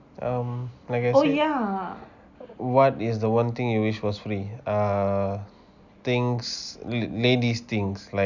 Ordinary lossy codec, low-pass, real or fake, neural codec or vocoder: none; 7.2 kHz; real; none